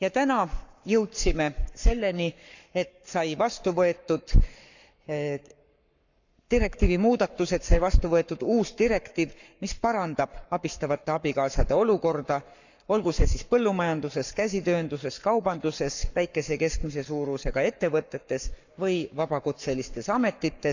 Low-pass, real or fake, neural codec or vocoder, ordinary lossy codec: 7.2 kHz; fake; codec, 44.1 kHz, 7.8 kbps, Pupu-Codec; none